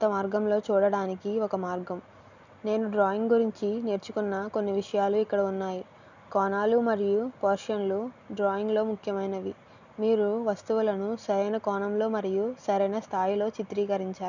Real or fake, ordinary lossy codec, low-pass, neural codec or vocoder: real; none; 7.2 kHz; none